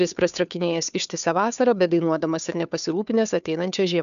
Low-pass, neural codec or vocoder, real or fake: 7.2 kHz; codec, 16 kHz, 2 kbps, FunCodec, trained on Chinese and English, 25 frames a second; fake